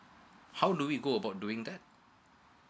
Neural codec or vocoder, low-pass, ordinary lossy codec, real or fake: none; none; none; real